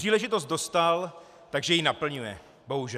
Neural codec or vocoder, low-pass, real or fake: none; 14.4 kHz; real